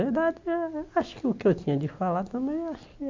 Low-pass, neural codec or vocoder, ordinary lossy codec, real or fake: 7.2 kHz; none; MP3, 48 kbps; real